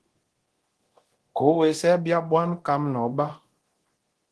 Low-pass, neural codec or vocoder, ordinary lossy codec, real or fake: 10.8 kHz; codec, 24 kHz, 0.9 kbps, DualCodec; Opus, 16 kbps; fake